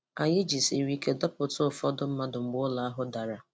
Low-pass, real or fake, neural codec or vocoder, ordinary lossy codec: none; real; none; none